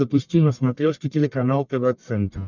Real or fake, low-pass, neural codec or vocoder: fake; 7.2 kHz; codec, 44.1 kHz, 1.7 kbps, Pupu-Codec